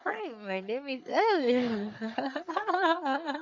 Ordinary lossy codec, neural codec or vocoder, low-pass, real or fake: none; codec, 44.1 kHz, 3.4 kbps, Pupu-Codec; 7.2 kHz; fake